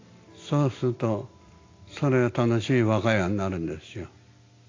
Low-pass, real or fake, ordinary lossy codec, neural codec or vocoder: 7.2 kHz; real; AAC, 32 kbps; none